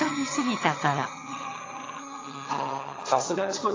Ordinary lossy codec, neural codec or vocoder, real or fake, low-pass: AAC, 32 kbps; vocoder, 22.05 kHz, 80 mel bands, HiFi-GAN; fake; 7.2 kHz